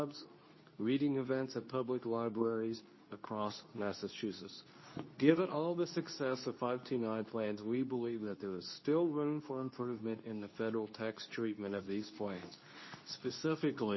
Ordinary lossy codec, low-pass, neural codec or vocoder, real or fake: MP3, 24 kbps; 7.2 kHz; codec, 24 kHz, 0.9 kbps, WavTokenizer, medium speech release version 2; fake